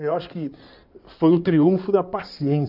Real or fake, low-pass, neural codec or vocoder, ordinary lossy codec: fake; 5.4 kHz; codec, 16 kHz in and 24 kHz out, 2.2 kbps, FireRedTTS-2 codec; none